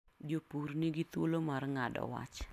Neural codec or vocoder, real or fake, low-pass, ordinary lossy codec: none; real; 14.4 kHz; none